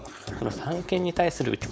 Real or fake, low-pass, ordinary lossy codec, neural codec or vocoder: fake; none; none; codec, 16 kHz, 4.8 kbps, FACodec